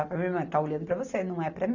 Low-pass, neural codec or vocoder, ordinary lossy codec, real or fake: 7.2 kHz; none; none; real